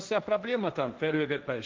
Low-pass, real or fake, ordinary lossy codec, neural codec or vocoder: 7.2 kHz; fake; Opus, 24 kbps; codec, 16 kHz, 1.1 kbps, Voila-Tokenizer